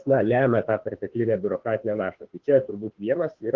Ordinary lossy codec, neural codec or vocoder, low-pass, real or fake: Opus, 16 kbps; autoencoder, 48 kHz, 32 numbers a frame, DAC-VAE, trained on Japanese speech; 7.2 kHz; fake